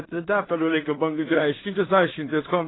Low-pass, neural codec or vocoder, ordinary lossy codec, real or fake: 7.2 kHz; codec, 16 kHz in and 24 kHz out, 0.4 kbps, LongCat-Audio-Codec, two codebook decoder; AAC, 16 kbps; fake